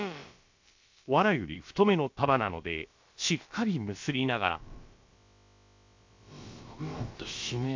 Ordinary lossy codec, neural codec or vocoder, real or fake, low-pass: MP3, 48 kbps; codec, 16 kHz, about 1 kbps, DyCAST, with the encoder's durations; fake; 7.2 kHz